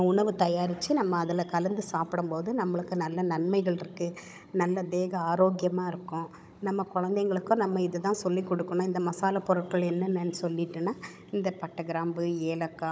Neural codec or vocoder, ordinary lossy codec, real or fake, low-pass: codec, 16 kHz, 16 kbps, FunCodec, trained on Chinese and English, 50 frames a second; none; fake; none